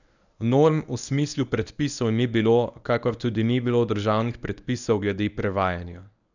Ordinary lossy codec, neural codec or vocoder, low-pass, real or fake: none; codec, 24 kHz, 0.9 kbps, WavTokenizer, medium speech release version 1; 7.2 kHz; fake